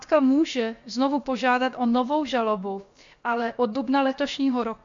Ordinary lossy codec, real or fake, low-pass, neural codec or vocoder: MP3, 48 kbps; fake; 7.2 kHz; codec, 16 kHz, about 1 kbps, DyCAST, with the encoder's durations